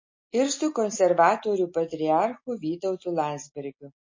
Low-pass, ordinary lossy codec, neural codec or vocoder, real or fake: 7.2 kHz; MP3, 32 kbps; none; real